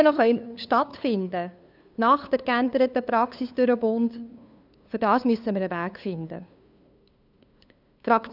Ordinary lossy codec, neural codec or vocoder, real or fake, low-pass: none; codec, 16 kHz, 2 kbps, FunCodec, trained on LibriTTS, 25 frames a second; fake; 5.4 kHz